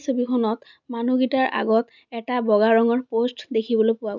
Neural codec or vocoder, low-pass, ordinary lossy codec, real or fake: none; 7.2 kHz; none; real